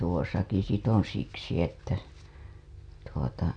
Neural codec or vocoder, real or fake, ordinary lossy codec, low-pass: none; real; none; 9.9 kHz